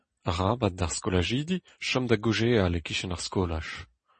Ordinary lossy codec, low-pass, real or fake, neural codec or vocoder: MP3, 32 kbps; 10.8 kHz; real; none